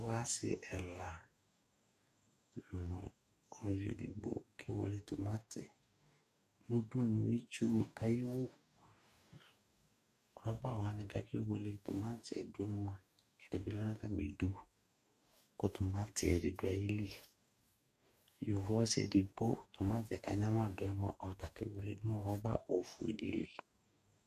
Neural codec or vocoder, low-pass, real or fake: codec, 44.1 kHz, 2.6 kbps, DAC; 14.4 kHz; fake